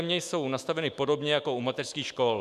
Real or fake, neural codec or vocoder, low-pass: fake; autoencoder, 48 kHz, 128 numbers a frame, DAC-VAE, trained on Japanese speech; 14.4 kHz